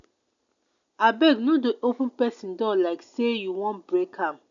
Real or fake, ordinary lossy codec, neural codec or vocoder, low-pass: real; none; none; 7.2 kHz